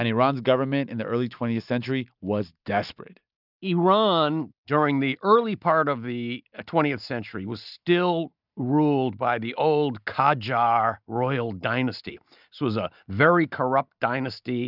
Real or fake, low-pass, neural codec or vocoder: real; 5.4 kHz; none